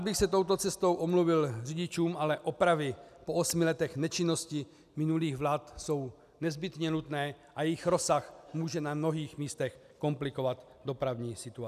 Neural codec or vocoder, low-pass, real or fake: none; 14.4 kHz; real